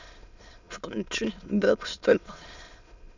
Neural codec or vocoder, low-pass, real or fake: autoencoder, 22.05 kHz, a latent of 192 numbers a frame, VITS, trained on many speakers; 7.2 kHz; fake